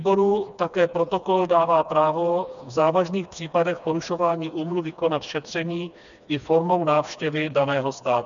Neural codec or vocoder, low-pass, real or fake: codec, 16 kHz, 2 kbps, FreqCodec, smaller model; 7.2 kHz; fake